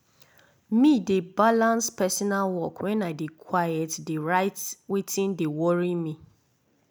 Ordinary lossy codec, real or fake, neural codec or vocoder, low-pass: none; real; none; none